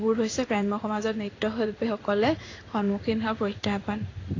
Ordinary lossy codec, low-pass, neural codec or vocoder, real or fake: AAC, 32 kbps; 7.2 kHz; codec, 16 kHz in and 24 kHz out, 1 kbps, XY-Tokenizer; fake